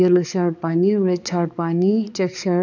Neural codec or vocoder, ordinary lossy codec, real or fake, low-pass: codec, 16 kHz, 4 kbps, X-Codec, HuBERT features, trained on balanced general audio; none; fake; 7.2 kHz